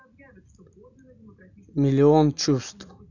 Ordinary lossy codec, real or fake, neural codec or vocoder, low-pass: none; real; none; 7.2 kHz